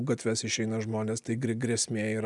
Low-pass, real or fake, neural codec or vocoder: 10.8 kHz; real; none